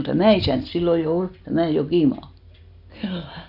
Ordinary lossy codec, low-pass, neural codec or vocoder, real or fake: none; 5.4 kHz; none; real